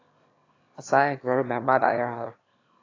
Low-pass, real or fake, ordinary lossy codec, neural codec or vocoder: 7.2 kHz; fake; AAC, 32 kbps; autoencoder, 22.05 kHz, a latent of 192 numbers a frame, VITS, trained on one speaker